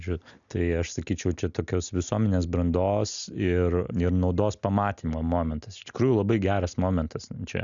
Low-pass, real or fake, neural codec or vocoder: 7.2 kHz; real; none